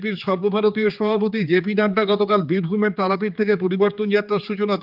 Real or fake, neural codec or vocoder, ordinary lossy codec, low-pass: fake; codec, 16 kHz, 4 kbps, X-Codec, HuBERT features, trained on general audio; Opus, 24 kbps; 5.4 kHz